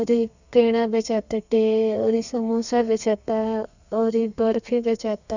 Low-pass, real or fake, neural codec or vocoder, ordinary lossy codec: 7.2 kHz; fake; codec, 32 kHz, 1.9 kbps, SNAC; none